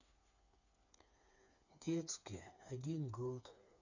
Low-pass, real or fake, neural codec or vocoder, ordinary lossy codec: 7.2 kHz; fake; codec, 16 kHz, 4 kbps, FreqCodec, smaller model; none